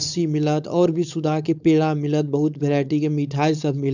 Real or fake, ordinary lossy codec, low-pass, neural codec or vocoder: fake; none; 7.2 kHz; codec, 16 kHz, 4.8 kbps, FACodec